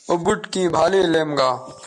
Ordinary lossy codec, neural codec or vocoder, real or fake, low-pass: MP3, 64 kbps; none; real; 10.8 kHz